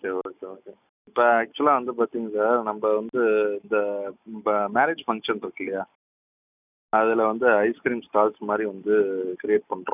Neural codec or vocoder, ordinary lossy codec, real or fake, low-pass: none; none; real; 3.6 kHz